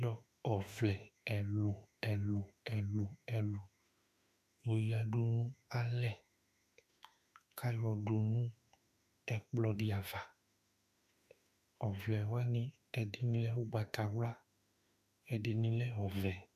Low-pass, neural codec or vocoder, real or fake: 14.4 kHz; autoencoder, 48 kHz, 32 numbers a frame, DAC-VAE, trained on Japanese speech; fake